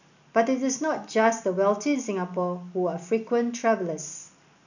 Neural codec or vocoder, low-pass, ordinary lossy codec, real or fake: none; 7.2 kHz; none; real